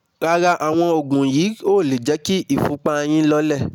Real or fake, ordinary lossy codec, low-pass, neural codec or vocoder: real; none; 19.8 kHz; none